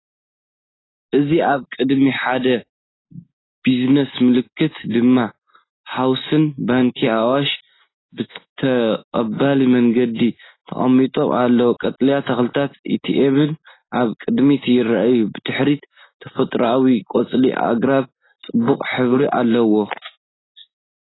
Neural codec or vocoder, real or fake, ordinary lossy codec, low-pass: none; real; AAC, 16 kbps; 7.2 kHz